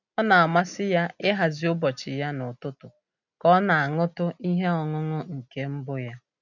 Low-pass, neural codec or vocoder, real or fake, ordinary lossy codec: 7.2 kHz; none; real; none